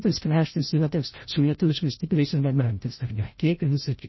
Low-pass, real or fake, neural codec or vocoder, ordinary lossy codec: 7.2 kHz; fake; codec, 16 kHz, 0.5 kbps, FreqCodec, larger model; MP3, 24 kbps